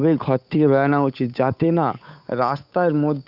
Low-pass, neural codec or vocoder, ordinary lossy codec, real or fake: 5.4 kHz; codec, 16 kHz, 8 kbps, FunCodec, trained on Chinese and English, 25 frames a second; none; fake